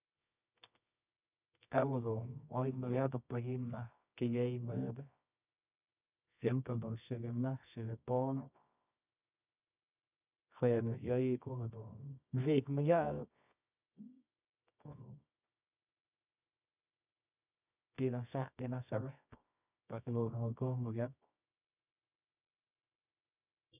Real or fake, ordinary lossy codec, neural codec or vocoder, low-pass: fake; none; codec, 24 kHz, 0.9 kbps, WavTokenizer, medium music audio release; 3.6 kHz